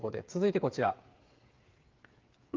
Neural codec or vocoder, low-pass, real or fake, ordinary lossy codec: codec, 16 kHz, 8 kbps, FreqCodec, smaller model; 7.2 kHz; fake; Opus, 32 kbps